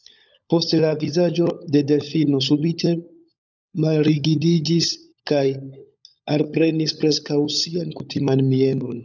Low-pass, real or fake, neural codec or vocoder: 7.2 kHz; fake; codec, 16 kHz, 16 kbps, FunCodec, trained on LibriTTS, 50 frames a second